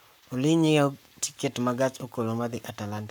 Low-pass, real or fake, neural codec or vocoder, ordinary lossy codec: none; fake; codec, 44.1 kHz, 7.8 kbps, Pupu-Codec; none